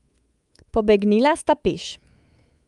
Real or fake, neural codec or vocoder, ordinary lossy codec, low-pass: fake; codec, 24 kHz, 3.1 kbps, DualCodec; Opus, 32 kbps; 10.8 kHz